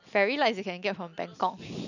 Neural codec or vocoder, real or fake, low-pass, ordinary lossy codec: none; real; 7.2 kHz; none